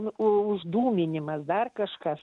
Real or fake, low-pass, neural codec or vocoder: real; 10.8 kHz; none